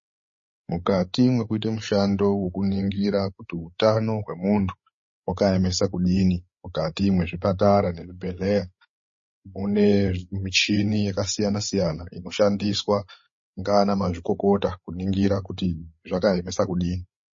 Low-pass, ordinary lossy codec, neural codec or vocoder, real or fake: 7.2 kHz; MP3, 32 kbps; codec, 16 kHz, 8 kbps, FreqCodec, larger model; fake